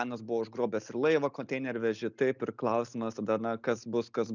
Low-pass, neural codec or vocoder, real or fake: 7.2 kHz; none; real